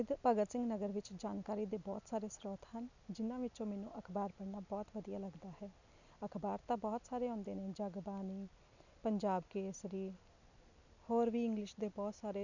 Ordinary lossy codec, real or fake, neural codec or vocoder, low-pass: none; real; none; 7.2 kHz